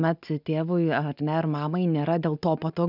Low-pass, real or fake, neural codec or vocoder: 5.4 kHz; real; none